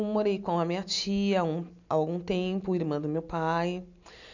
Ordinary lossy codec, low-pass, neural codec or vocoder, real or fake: none; 7.2 kHz; none; real